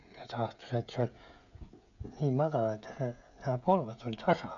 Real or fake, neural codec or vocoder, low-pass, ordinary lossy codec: fake; codec, 16 kHz, 16 kbps, FreqCodec, smaller model; 7.2 kHz; none